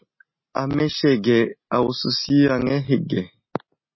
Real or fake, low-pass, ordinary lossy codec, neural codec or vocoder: real; 7.2 kHz; MP3, 24 kbps; none